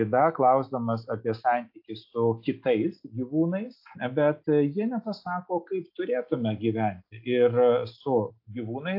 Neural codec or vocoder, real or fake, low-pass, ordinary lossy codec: none; real; 5.4 kHz; AAC, 48 kbps